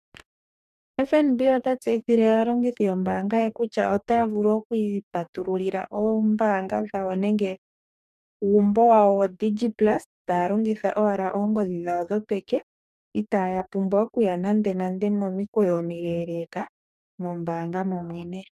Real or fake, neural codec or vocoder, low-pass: fake; codec, 44.1 kHz, 2.6 kbps, DAC; 14.4 kHz